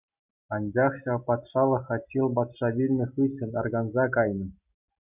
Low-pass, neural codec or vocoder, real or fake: 3.6 kHz; none; real